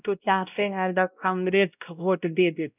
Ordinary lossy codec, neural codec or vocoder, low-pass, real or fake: none; codec, 16 kHz, 0.5 kbps, X-Codec, WavLM features, trained on Multilingual LibriSpeech; 3.6 kHz; fake